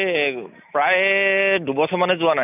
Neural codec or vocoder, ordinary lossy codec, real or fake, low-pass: none; none; real; 3.6 kHz